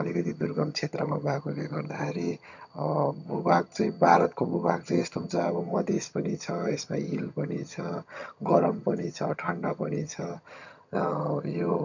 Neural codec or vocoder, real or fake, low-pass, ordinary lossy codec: vocoder, 22.05 kHz, 80 mel bands, HiFi-GAN; fake; 7.2 kHz; none